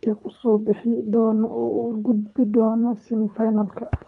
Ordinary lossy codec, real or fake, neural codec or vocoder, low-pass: none; fake; codec, 24 kHz, 3 kbps, HILCodec; 10.8 kHz